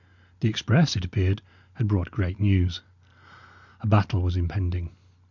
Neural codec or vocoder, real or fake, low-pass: none; real; 7.2 kHz